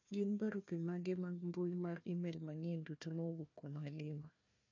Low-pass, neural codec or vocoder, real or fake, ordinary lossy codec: 7.2 kHz; codec, 16 kHz, 1 kbps, FunCodec, trained on Chinese and English, 50 frames a second; fake; MP3, 48 kbps